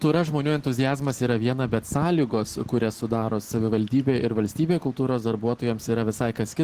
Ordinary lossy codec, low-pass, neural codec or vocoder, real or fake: Opus, 16 kbps; 14.4 kHz; none; real